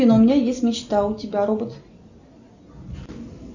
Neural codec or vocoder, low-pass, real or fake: none; 7.2 kHz; real